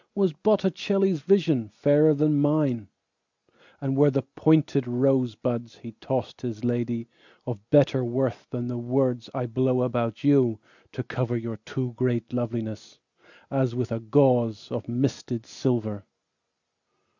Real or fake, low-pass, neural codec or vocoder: real; 7.2 kHz; none